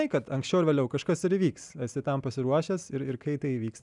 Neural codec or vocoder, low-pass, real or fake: none; 10.8 kHz; real